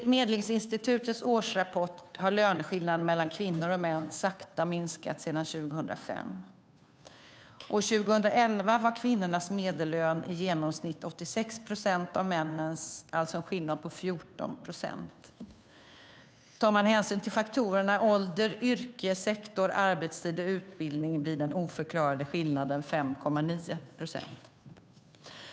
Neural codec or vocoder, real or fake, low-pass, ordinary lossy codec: codec, 16 kHz, 2 kbps, FunCodec, trained on Chinese and English, 25 frames a second; fake; none; none